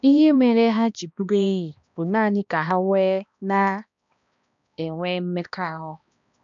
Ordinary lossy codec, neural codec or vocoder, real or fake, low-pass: none; codec, 16 kHz, 1 kbps, X-Codec, HuBERT features, trained on balanced general audio; fake; 7.2 kHz